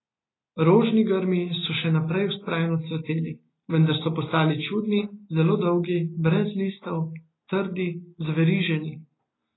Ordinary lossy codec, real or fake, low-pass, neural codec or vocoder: AAC, 16 kbps; real; 7.2 kHz; none